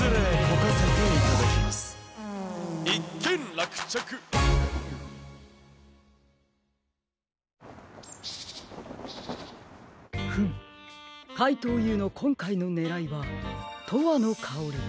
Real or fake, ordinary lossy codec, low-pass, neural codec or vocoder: real; none; none; none